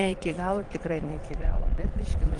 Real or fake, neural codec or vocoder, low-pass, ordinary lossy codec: fake; vocoder, 22.05 kHz, 80 mel bands, Vocos; 9.9 kHz; Opus, 24 kbps